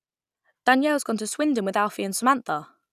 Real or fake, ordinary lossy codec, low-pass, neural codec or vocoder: real; none; 14.4 kHz; none